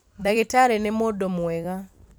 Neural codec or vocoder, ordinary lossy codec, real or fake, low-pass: vocoder, 44.1 kHz, 128 mel bands every 256 samples, BigVGAN v2; none; fake; none